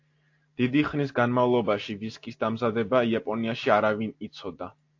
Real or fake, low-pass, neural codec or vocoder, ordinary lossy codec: real; 7.2 kHz; none; AAC, 48 kbps